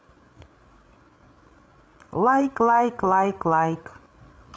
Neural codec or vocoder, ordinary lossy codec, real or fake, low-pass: codec, 16 kHz, 8 kbps, FreqCodec, larger model; none; fake; none